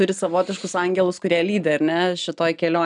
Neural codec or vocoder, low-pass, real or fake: none; 9.9 kHz; real